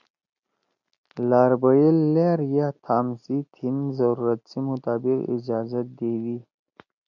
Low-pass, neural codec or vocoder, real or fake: 7.2 kHz; none; real